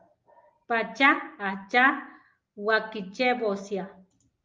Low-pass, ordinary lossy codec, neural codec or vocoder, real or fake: 7.2 kHz; Opus, 24 kbps; none; real